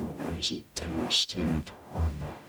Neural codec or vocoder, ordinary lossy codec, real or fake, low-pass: codec, 44.1 kHz, 0.9 kbps, DAC; none; fake; none